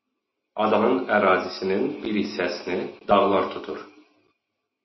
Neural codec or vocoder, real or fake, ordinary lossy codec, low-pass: none; real; MP3, 24 kbps; 7.2 kHz